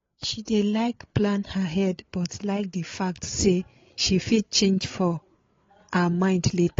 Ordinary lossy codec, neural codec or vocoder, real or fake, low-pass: AAC, 32 kbps; codec, 16 kHz, 8 kbps, FreqCodec, larger model; fake; 7.2 kHz